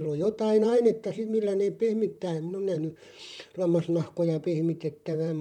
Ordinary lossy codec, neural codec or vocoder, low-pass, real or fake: none; vocoder, 44.1 kHz, 128 mel bands, Pupu-Vocoder; 19.8 kHz; fake